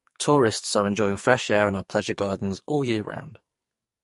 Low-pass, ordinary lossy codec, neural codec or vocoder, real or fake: 14.4 kHz; MP3, 48 kbps; codec, 44.1 kHz, 2.6 kbps, SNAC; fake